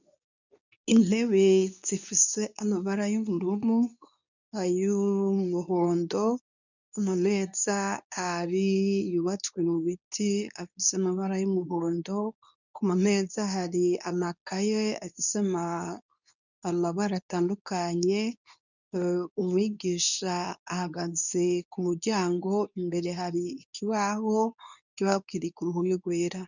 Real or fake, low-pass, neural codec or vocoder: fake; 7.2 kHz; codec, 24 kHz, 0.9 kbps, WavTokenizer, medium speech release version 2